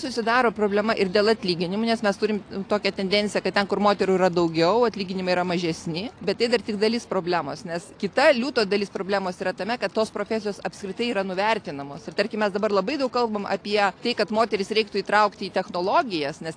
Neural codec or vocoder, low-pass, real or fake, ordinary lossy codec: none; 9.9 kHz; real; AAC, 48 kbps